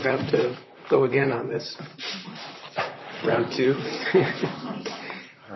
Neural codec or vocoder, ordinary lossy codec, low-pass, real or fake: vocoder, 44.1 kHz, 128 mel bands, Pupu-Vocoder; MP3, 24 kbps; 7.2 kHz; fake